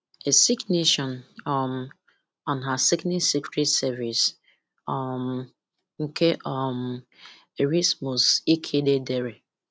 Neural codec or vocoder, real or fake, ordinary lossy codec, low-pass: none; real; none; none